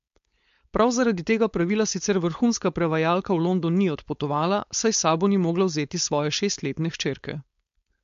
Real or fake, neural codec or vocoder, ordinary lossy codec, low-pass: fake; codec, 16 kHz, 4.8 kbps, FACodec; MP3, 48 kbps; 7.2 kHz